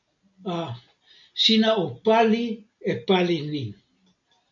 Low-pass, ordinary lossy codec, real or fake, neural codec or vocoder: 7.2 kHz; MP3, 96 kbps; real; none